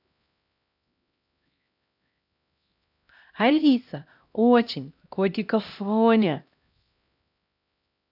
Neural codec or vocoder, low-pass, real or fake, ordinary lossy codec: codec, 16 kHz, 1 kbps, X-Codec, HuBERT features, trained on LibriSpeech; 5.4 kHz; fake; none